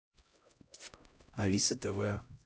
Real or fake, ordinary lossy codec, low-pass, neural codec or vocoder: fake; none; none; codec, 16 kHz, 0.5 kbps, X-Codec, HuBERT features, trained on LibriSpeech